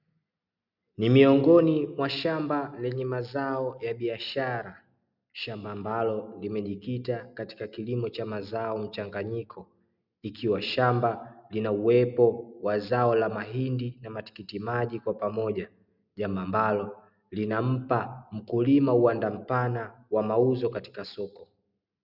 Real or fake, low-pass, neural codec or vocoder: real; 5.4 kHz; none